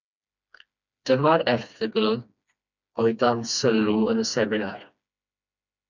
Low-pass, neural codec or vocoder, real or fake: 7.2 kHz; codec, 16 kHz, 2 kbps, FreqCodec, smaller model; fake